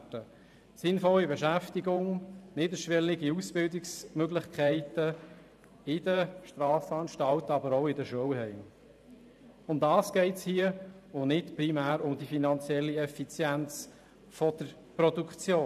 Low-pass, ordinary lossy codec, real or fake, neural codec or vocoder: 14.4 kHz; none; fake; vocoder, 44.1 kHz, 128 mel bands every 512 samples, BigVGAN v2